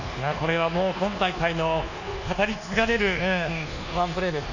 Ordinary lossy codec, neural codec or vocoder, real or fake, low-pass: none; codec, 24 kHz, 1.2 kbps, DualCodec; fake; 7.2 kHz